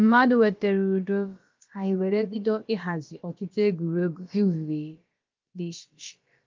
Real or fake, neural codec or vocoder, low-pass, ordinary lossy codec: fake; codec, 16 kHz, about 1 kbps, DyCAST, with the encoder's durations; 7.2 kHz; Opus, 32 kbps